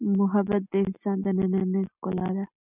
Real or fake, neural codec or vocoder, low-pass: real; none; 3.6 kHz